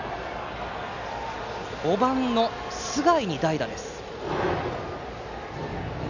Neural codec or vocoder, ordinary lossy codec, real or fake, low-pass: none; none; real; 7.2 kHz